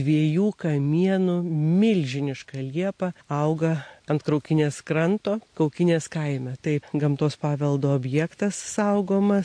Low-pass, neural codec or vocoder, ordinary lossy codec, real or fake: 9.9 kHz; none; MP3, 48 kbps; real